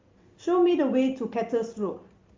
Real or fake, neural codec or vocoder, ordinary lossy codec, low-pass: real; none; Opus, 32 kbps; 7.2 kHz